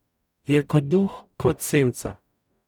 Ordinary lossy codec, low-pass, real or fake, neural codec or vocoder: none; 19.8 kHz; fake; codec, 44.1 kHz, 0.9 kbps, DAC